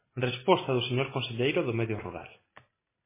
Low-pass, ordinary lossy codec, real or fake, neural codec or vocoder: 3.6 kHz; MP3, 16 kbps; real; none